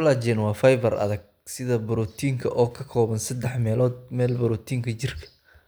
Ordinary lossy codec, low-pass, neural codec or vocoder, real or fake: none; none; none; real